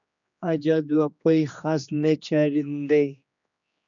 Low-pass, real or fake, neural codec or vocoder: 7.2 kHz; fake; codec, 16 kHz, 2 kbps, X-Codec, HuBERT features, trained on general audio